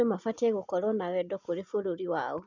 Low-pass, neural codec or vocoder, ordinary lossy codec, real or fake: 7.2 kHz; none; none; real